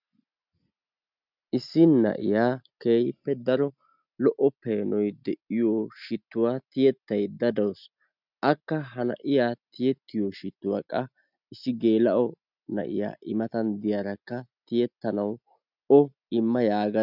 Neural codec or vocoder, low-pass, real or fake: none; 5.4 kHz; real